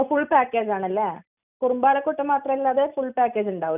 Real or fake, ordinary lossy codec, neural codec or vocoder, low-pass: real; none; none; 3.6 kHz